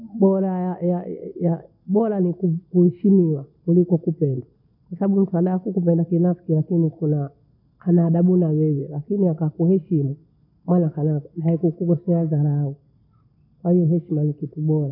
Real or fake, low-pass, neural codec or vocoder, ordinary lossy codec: real; 5.4 kHz; none; none